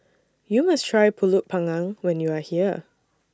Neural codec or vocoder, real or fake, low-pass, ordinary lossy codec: none; real; none; none